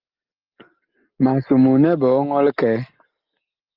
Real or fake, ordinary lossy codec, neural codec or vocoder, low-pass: real; Opus, 32 kbps; none; 5.4 kHz